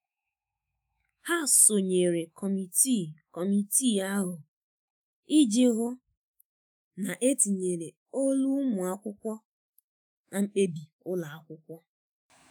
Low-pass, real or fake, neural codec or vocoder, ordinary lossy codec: none; fake; autoencoder, 48 kHz, 128 numbers a frame, DAC-VAE, trained on Japanese speech; none